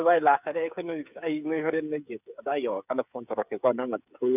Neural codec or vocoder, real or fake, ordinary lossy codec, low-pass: codec, 16 kHz, 8 kbps, FreqCodec, smaller model; fake; none; 3.6 kHz